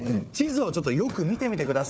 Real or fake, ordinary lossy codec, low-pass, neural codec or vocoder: fake; none; none; codec, 16 kHz, 4 kbps, FunCodec, trained on Chinese and English, 50 frames a second